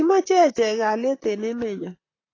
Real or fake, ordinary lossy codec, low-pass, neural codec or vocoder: fake; AAC, 32 kbps; 7.2 kHz; vocoder, 44.1 kHz, 128 mel bands, Pupu-Vocoder